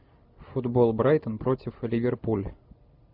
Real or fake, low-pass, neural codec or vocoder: real; 5.4 kHz; none